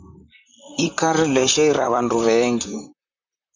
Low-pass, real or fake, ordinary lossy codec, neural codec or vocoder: 7.2 kHz; fake; MP3, 64 kbps; vocoder, 44.1 kHz, 128 mel bands, Pupu-Vocoder